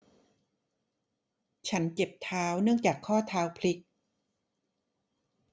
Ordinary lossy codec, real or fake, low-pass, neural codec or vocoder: none; real; none; none